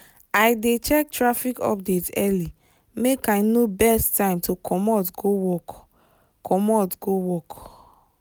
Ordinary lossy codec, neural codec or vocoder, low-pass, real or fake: none; none; none; real